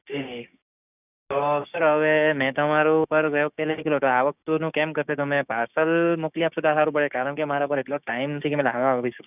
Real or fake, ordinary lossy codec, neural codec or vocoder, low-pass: fake; none; codec, 16 kHz, 6 kbps, DAC; 3.6 kHz